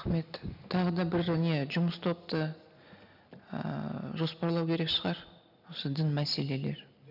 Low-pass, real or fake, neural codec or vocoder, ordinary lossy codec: 5.4 kHz; real; none; none